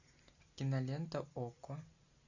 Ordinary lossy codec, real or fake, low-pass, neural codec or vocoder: Opus, 64 kbps; real; 7.2 kHz; none